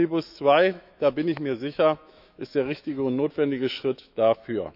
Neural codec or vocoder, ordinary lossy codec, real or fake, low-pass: autoencoder, 48 kHz, 128 numbers a frame, DAC-VAE, trained on Japanese speech; none; fake; 5.4 kHz